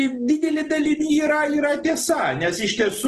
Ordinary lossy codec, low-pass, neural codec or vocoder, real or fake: Opus, 16 kbps; 10.8 kHz; none; real